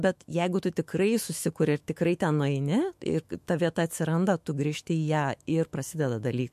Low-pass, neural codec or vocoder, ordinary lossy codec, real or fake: 14.4 kHz; autoencoder, 48 kHz, 128 numbers a frame, DAC-VAE, trained on Japanese speech; MP3, 64 kbps; fake